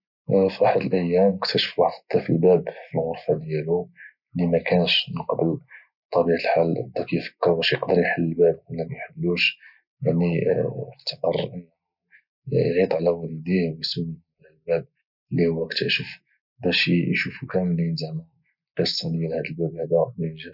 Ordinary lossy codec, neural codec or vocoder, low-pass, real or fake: none; none; 5.4 kHz; real